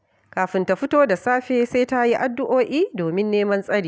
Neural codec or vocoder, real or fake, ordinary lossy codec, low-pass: none; real; none; none